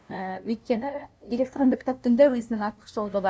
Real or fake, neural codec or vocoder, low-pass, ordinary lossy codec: fake; codec, 16 kHz, 0.5 kbps, FunCodec, trained on LibriTTS, 25 frames a second; none; none